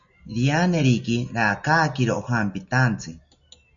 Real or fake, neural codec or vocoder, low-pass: real; none; 7.2 kHz